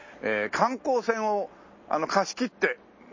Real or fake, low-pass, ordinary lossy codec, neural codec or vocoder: real; 7.2 kHz; MP3, 32 kbps; none